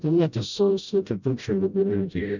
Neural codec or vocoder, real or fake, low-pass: codec, 16 kHz, 0.5 kbps, FreqCodec, smaller model; fake; 7.2 kHz